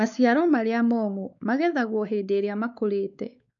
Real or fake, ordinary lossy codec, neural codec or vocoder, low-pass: fake; none; codec, 16 kHz, 4 kbps, X-Codec, WavLM features, trained on Multilingual LibriSpeech; 7.2 kHz